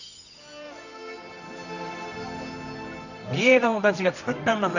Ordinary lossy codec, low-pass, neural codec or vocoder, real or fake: none; 7.2 kHz; codec, 24 kHz, 0.9 kbps, WavTokenizer, medium music audio release; fake